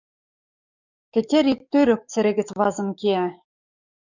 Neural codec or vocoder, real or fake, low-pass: codec, 44.1 kHz, 7.8 kbps, DAC; fake; 7.2 kHz